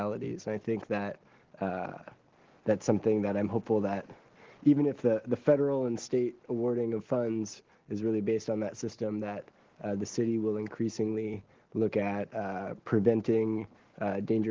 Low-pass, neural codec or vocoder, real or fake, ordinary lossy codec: 7.2 kHz; none; real; Opus, 16 kbps